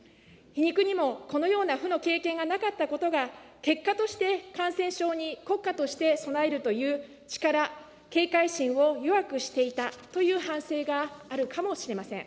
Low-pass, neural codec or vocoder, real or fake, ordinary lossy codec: none; none; real; none